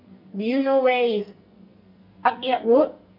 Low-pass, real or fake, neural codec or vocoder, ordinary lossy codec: 5.4 kHz; fake; codec, 44.1 kHz, 2.6 kbps, SNAC; none